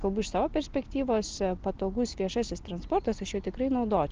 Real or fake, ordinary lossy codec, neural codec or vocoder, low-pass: real; Opus, 16 kbps; none; 7.2 kHz